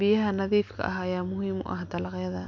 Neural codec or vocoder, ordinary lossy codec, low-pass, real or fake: none; MP3, 48 kbps; 7.2 kHz; real